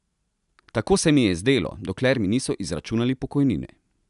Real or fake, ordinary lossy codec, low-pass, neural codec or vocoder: real; none; 10.8 kHz; none